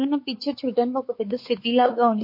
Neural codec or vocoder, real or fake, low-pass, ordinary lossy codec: codec, 16 kHz, 4 kbps, X-Codec, WavLM features, trained on Multilingual LibriSpeech; fake; 5.4 kHz; MP3, 32 kbps